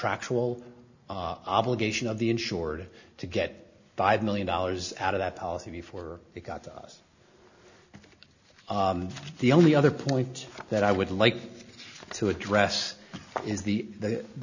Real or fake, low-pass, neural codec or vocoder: real; 7.2 kHz; none